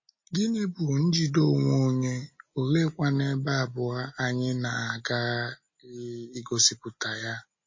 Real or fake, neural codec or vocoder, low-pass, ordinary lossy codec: real; none; 7.2 kHz; MP3, 32 kbps